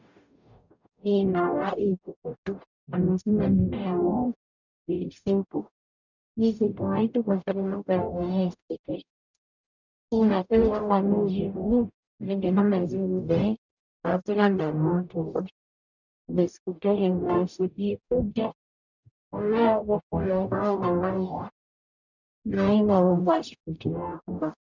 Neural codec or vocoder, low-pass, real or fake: codec, 44.1 kHz, 0.9 kbps, DAC; 7.2 kHz; fake